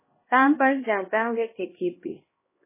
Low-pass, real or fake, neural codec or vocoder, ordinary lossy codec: 3.6 kHz; fake; codec, 16 kHz, 1 kbps, X-Codec, HuBERT features, trained on LibriSpeech; MP3, 16 kbps